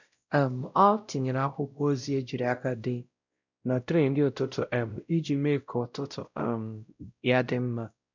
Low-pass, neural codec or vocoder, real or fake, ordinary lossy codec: 7.2 kHz; codec, 16 kHz, 0.5 kbps, X-Codec, WavLM features, trained on Multilingual LibriSpeech; fake; none